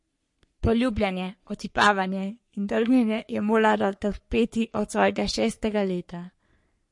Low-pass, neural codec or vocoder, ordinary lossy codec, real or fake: 10.8 kHz; codec, 44.1 kHz, 3.4 kbps, Pupu-Codec; MP3, 48 kbps; fake